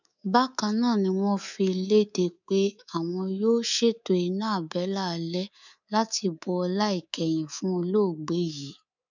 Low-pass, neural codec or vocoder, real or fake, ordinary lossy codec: 7.2 kHz; codec, 24 kHz, 3.1 kbps, DualCodec; fake; none